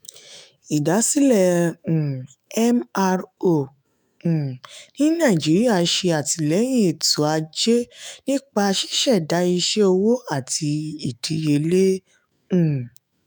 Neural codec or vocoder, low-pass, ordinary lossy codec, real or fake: autoencoder, 48 kHz, 128 numbers a frame, DAC-VAE, trained on Japanese speech; none; none; fake